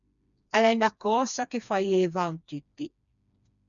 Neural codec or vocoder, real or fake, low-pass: codec, 16 kHz, 2 kbps, FreqCodec, smaller model; fake; 7.2 kHz